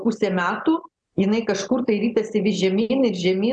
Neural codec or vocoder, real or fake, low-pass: none; real; 9.9 kHz